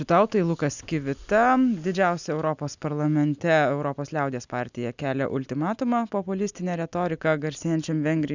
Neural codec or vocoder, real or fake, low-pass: none; real; 7.2 kHz